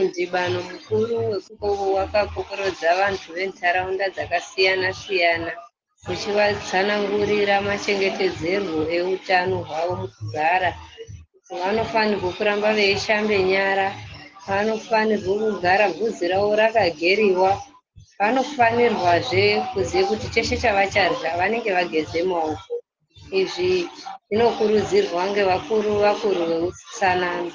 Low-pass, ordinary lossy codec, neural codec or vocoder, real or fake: 7.2 kHz; Opus, 16 kbps; none; real